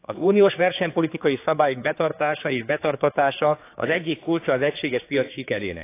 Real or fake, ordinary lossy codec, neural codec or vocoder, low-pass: fake; AAC, 24 kbps; codec, 16 kHz, 4 kbps, X-Codec, HuBERT features, trained on general audio; 3.6 kHz